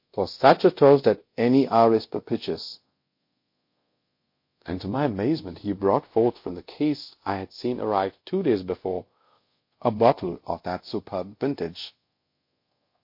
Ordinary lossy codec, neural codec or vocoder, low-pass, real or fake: MP3, 32 kbps; codec, 24 kHz, 0.5 kbps, DualCodec; 5.4 kHz; fake